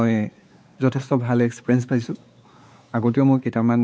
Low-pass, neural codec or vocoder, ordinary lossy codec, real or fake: none; codec, 16 kHz, 4 kbps, X-Codec, WavLM features, trained on Multilingual LibriSpeech; none; fake